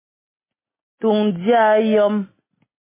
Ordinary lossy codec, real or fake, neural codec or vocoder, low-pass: MP3, 16 kbps; real; none; 3.6 kHz